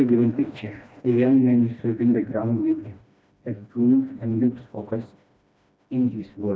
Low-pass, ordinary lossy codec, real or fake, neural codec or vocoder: none; none; fake; codec, 16 kHz, 1 kbps, FreqCodec, smaller model